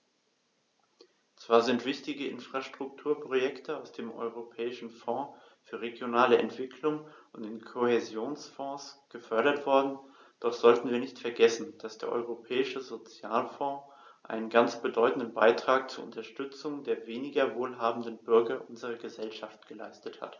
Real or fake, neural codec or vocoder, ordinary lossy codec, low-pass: real; none; none; 7.2 kHz